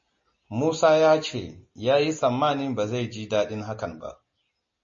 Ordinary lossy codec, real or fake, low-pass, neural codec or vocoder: MP3, 32 kbps; real; 7.2 kHz; none